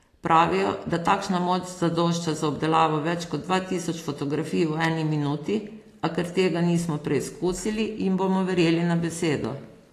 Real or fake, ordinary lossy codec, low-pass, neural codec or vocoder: real; AAC, 48 kbps; 14.4 kHz; none